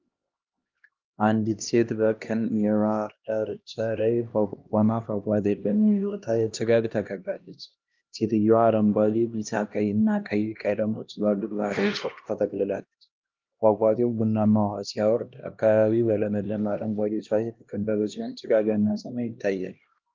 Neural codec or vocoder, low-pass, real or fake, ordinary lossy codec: codec, 16 kHz, 1 kbps, X-Codec, HuBERT features, trained on LibriSpeech; 7.2 kHz; fake; Opus, 24 kbps